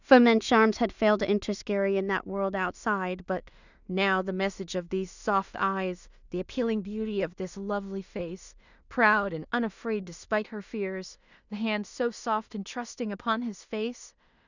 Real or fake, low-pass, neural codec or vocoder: fake; 7.2 kHz; codec, 16 kHz in and 24 kHz out, 0.4 kbps, LongCat-Audio-Codec, two codebook decoder